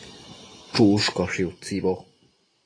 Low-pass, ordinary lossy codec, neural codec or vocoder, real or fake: 9.9 kHz; AAC, 32 kbps; none; real